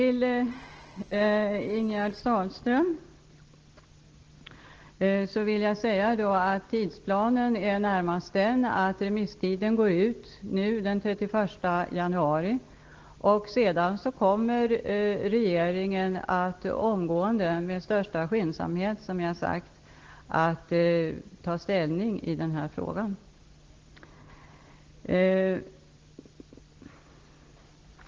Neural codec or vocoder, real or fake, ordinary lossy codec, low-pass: none; real; Opus, 16 kbps; 7.2 kHz